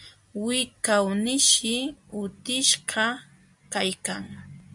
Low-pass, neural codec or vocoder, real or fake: 10.8 kHz; none; real